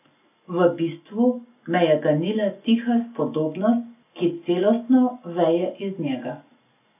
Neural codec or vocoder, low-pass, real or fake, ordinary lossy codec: none; 3.6 kHz; real; none